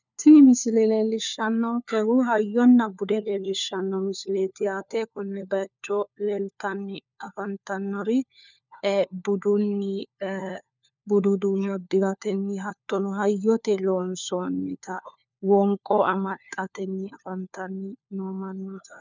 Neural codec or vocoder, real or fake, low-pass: codec, 16 kHz, 4 kbps, FunCodec, trained on LibriTTS, 50 frames a second; fake; 7.2 kHz